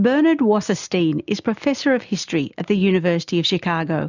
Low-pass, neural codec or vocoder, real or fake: 7.2 kHz; none; real